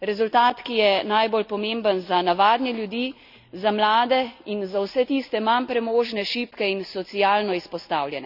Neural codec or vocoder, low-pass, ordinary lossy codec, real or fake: none; 5.4 kHz; none; real